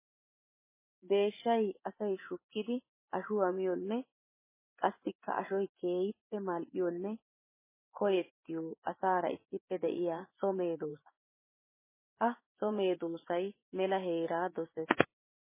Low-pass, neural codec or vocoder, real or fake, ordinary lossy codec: 3.6 kHz; vocoder, 24 kHz, 100 mel bands, Vocos; fake; MP3, 16 kbps